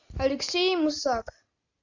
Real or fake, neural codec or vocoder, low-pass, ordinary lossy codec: real; none; 7.2 kHz; Opus, 64 kbps